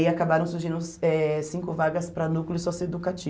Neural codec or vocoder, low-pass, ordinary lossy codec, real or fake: none; none; none; real